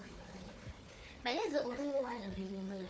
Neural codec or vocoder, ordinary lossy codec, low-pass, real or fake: codec, 16 kHz, 4 kbps, FunCodec, trained on Chinese and English, 50 frames a second; none; none; fake